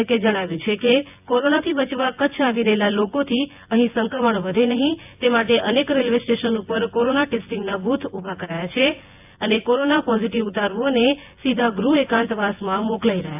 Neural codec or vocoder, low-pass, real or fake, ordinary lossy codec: vocoder, 24 kHz, 100 mel bands, Vocos; 3.6 kHz; fake; AAC, 32 kbps